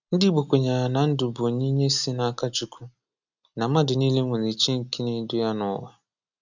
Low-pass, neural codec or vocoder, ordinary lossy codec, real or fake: 7.2 kHz; none; none; real